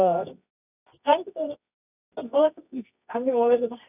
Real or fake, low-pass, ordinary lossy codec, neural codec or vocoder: fake; 3.6 kHz; none; codec, 24 kHz, 0.9 kbps, WavTokenizer, medium music audio release